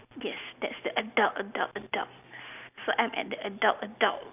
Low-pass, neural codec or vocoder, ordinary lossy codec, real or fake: 3.6 kHz; none; none; real